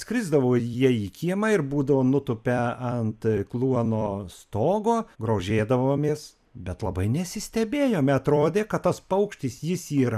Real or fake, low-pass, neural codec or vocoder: fake; 14.4 kHz; vocoder, 44.1 kHz, 128 mel bands every 256 samples, BigVGAN v2